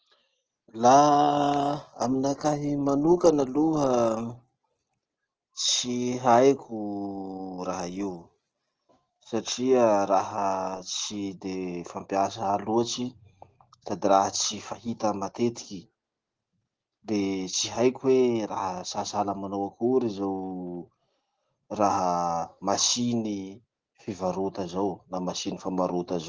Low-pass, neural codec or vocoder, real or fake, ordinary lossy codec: 7.2 kHz; none; real; Opus, 16 kbps